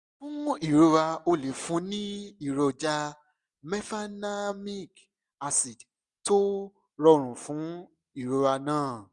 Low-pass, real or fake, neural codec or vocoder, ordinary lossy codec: 10.8 kHz; real; none; none